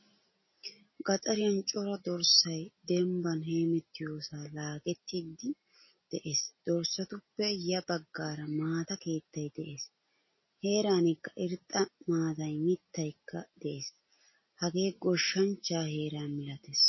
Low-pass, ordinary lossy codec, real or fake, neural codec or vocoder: 7.2 kHz; MP3, 24 kbps; real; none